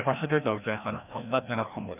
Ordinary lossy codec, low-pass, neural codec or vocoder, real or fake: none; 3.6 kHz; codec, 16 kHz, 1 kbps, FreqCodec, larger model; fake